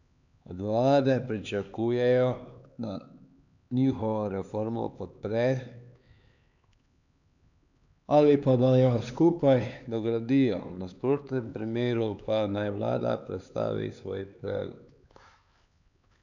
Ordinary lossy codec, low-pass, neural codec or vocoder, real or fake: none; 7.2 kHz; codec, 16 kHz, 4 kbps, X-Codec, HuBERT features, trained on LibriSpeech; fake